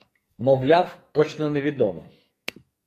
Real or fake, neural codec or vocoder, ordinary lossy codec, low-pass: fake; codec, 44.1 kHz, 2.6 kbps, SNAC; AAC, 48 kbps; 14.4 kHz